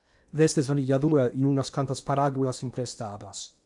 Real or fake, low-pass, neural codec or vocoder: fake; 10.8 kHz; codec, 16 kHz in and 24 kHz out, 0.8 kbps, FocalCodec, streaming, 65536 codes